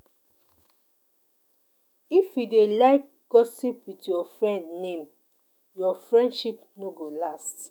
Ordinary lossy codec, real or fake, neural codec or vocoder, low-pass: none; fake; autoencoder, 48 kHz, 128 numbers a frame, DAC-VAE, trained on Japanese speech; none